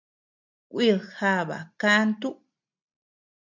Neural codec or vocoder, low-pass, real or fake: none; 7.2 kHz; real